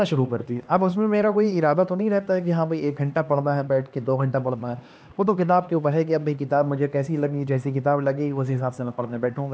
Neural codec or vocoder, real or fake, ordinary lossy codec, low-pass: codec, 16 kHz, 2 kbps, X-Codec, HuBERT features, trained on LibriSpeech; fake; none; none